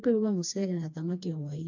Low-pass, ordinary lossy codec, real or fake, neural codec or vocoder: 7.2 kHz; AAC, 48 kbps; fake; codec, 16 kHz, 2 kbps, FreqCodec, smaller model